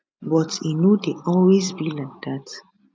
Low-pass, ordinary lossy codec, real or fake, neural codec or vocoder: none; none; real; none